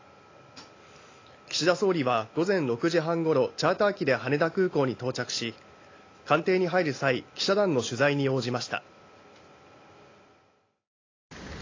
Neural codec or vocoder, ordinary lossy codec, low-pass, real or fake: none; AAC, 32 kbps; 7.2 kHz; real